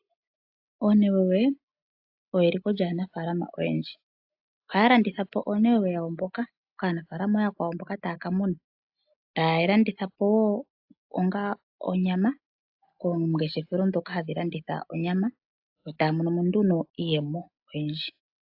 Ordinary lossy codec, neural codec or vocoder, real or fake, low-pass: AAC, 48 kbps; none; real; 5.4 kHz